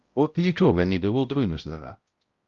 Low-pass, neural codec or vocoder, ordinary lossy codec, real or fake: 7.2 kHz; codec, 16 kHz, 0.5 kbps, X-Codec, HuBERT features, trained on balanced general audio; Opus, 32 kbps; fake